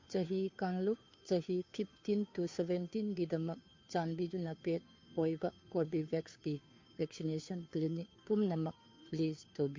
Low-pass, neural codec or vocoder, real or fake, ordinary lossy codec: 7.2 kHz; codec, 16 kHz, 2 kbps, FunCodec, trained on Chinese and English, 25 frames a second; fake; MP3, 48 kbps